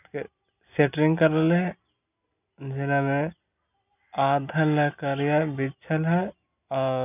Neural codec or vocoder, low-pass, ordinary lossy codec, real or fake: none; 3.6 kHz; none; real